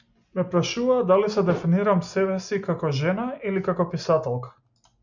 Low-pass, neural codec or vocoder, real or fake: 7.2 kHz; none; real